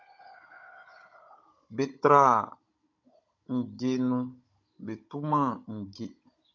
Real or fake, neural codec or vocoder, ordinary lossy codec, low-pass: fake; codec, 16 kHz, 16 kbps, FunCodec, trained on Chinese and English, 50 frames a second; MP3, 48 kbps; 7.2 kHz